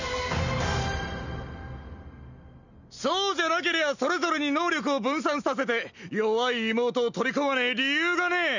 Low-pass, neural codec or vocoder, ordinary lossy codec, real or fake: 7.2 kHz; none; none; real